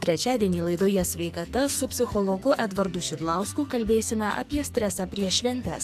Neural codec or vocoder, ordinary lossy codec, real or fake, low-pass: codec, 44.1 kHz, 2.6 kbps, SNAC; AAC, 96 kbps; fake; 14.4 kHz